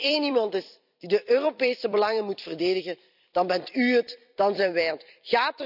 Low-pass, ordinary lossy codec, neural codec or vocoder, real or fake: 5.4 kHz; none; vocoder, 44.1 kHz, 128 mel bands every 256 samples, BigVGAN v2; fake